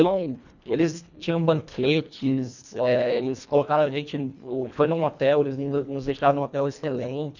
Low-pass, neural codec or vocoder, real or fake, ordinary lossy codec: 7.2 kHz; codec, 24 kHz, 1.5 kbps, HILCodec; fake; none